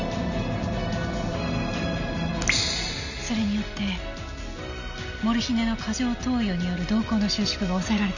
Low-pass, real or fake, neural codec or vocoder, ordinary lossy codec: 7.2 kHz; real; none; none